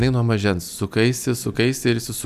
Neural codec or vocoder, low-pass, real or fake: none; 14.4 kHz; real